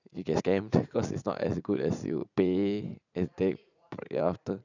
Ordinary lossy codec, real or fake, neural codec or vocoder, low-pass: none; fake; vocoder, 44.1 kHz, 80 mel bands, Vocos; 7.2 kHz